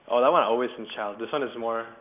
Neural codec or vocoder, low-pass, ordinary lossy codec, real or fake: none; 3.6 kHz; none; real